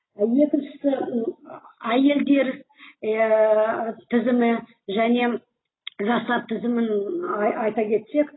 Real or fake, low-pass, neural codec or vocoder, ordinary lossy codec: real; 7.2 kHz; none; AAC, 16 kbps